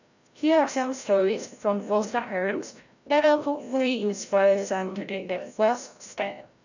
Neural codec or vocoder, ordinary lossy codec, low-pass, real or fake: codec, 16 kHz, 0.5 kbps, FreqCodec, larger model; none; 7.2 kHz; fake